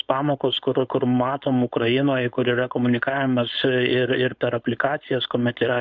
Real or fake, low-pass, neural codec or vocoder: fake; 7.2 kHz; codec, 16 kHz, 4.8 kbps, FACodec